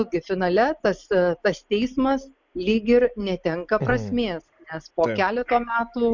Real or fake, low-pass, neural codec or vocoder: real; 7.2 kHz; none